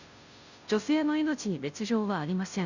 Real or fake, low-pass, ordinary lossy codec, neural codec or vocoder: fake; 7.2 kHz; none; codec, 16 kHz, 0.5 kbps, FunCodec, trained on Chinese and English, 25 frames a second